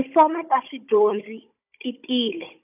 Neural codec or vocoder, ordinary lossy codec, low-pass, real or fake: codec, 16 kHz, 16 kbps, FunCodec, trained on Chinese and English, 50 frames a second; none; 3.6 kHz; fake